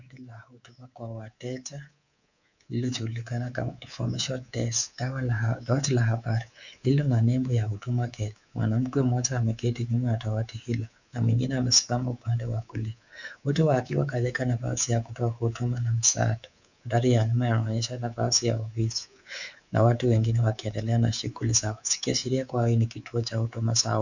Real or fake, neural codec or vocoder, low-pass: fake; codec, 24 kHz, 3.1 kbps, DualCodec; 7.2 kHz